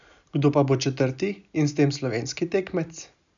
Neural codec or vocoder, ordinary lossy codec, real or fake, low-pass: none; none; real; 7.2 kHz